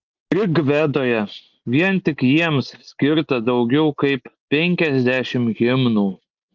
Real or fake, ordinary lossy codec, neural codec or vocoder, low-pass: real; Opus, 24 kbps; none; 7.2 kHz